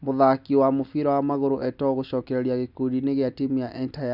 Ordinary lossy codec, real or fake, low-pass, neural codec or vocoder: none; real; 5.4 kHz; none